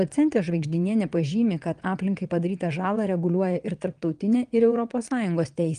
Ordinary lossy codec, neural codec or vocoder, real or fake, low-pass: Opus, 32 kbps; vocoder, 22.05 kHz, 80 mel bands, WaveNeXt; fake; 9.9 kHz